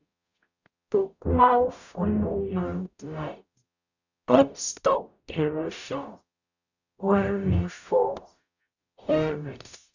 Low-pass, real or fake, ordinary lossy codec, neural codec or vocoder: 7.2 kHz; fake; none; codec, 44.1 kHz, 0.9 kbps, DAC